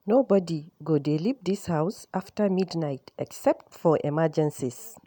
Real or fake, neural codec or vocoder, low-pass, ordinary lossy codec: real; none; none; none